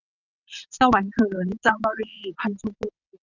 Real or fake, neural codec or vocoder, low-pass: fake; vocoder, 22.05 kHz, 80 mel bands, WaveNeXt; 7.2 kHz